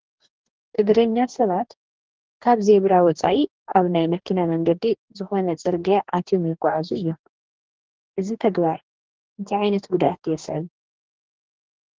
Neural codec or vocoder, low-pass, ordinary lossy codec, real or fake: codec, 44.1 kHz, 2.6 kbps, DAC; 7.2 kHz; Opus, 16 kbps; fake